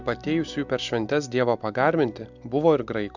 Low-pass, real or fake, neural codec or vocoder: 7.2 kHz; real; none